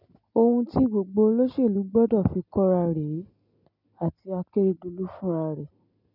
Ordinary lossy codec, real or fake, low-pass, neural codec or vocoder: none; real; 5.4 kHz; none